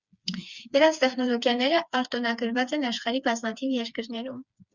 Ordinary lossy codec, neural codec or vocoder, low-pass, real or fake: Opus, 64 kbps; codec, 16 kHz, 4 kbps, FreqCodec, smaller model; 7.2 kHz; fake